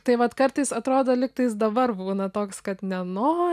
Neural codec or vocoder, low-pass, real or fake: none; 14.4 kHz; real